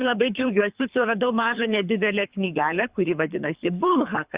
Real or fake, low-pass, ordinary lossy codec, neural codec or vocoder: fake; 3.6 kHz; Opus, 64 kbps; codec, 24 kHz, 3 kbps, HILCodec